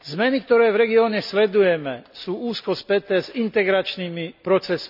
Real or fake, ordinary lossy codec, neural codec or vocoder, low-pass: real; none; none; 5.4 kHz